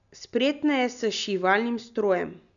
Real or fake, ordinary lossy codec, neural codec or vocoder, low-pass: real; none; none; 7.2 kHz